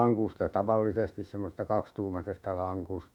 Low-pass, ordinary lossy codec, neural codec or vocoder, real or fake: 19.8 kHz; none; autoencoder, 48 kHz, 32 numbers a frame, DAC-VAE, trained on Japanese speech; fake